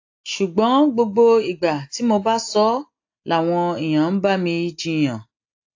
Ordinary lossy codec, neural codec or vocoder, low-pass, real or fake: AAC, 48 kbps; none; 7.2 kHz; real